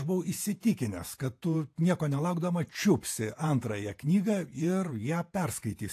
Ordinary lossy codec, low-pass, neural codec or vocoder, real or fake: AAC, 64 kbps; 14.4 kHz; vocoder, 48 kHz, 128 mel bands, Vocos; fake